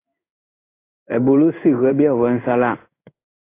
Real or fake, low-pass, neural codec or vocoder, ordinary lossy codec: fake; 3.6 kHz; codec, 16 kHz in and 24 kHz out, 1 kbps, XY-Tokenizer; AAC, 24 kbps